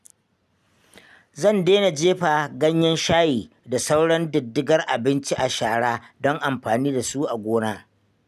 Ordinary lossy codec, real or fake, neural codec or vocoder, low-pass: none; real; none; 14.4 kHz